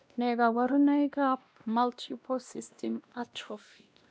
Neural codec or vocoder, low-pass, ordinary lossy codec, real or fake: codec, 16 kHz, 1 kbps, X-Codec, WavLM features, trained on Multilingual LibriSpeech; none; none; fake